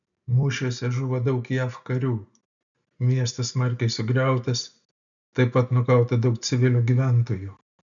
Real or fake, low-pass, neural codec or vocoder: real; 7.2 kHz; none